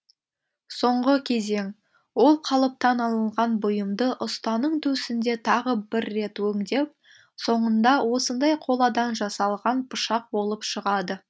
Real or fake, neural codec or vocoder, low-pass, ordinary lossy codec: real; none; none; none